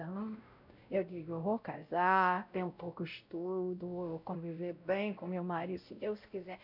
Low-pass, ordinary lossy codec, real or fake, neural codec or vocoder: 5.4 kHz; none; fake; codec, 16 kHz, 0.5 kbps, X-Codec, WavLM features, trained on Multilingual LibriSpeech